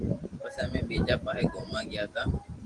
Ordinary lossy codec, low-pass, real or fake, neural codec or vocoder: Opus, 24 kbps; 10.8 kHz; fake; vocoder, 44.1 kHz, 128 mel bands every 512 samples, BigVGAN v2